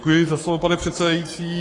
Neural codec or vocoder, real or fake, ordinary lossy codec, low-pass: codec, 44.1 kHz, 7.8 kbps, Pupu-Codec; fake; AAC, 32 kbps; 10.8 kHz